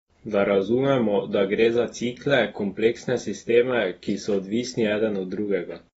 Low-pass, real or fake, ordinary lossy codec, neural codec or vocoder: 19.8 kHz; real; AAC, 24 kbps; none